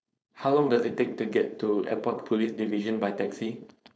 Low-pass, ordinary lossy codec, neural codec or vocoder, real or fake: none; none; codec, 16 kHz, 4.8 kbps, FACodec; fake